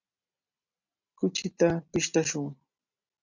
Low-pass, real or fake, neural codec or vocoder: 7.2 kHz; real; none